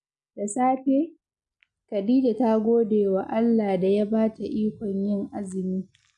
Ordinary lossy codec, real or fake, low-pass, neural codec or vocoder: none; real; 10.8 kHz; none